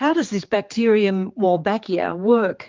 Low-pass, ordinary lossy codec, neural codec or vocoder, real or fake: 7.2 kHz; Opus, 32 kbps; codec, 16 kHz, 4 kbps, X-Codec, HuBERT features, trained on general audio; fake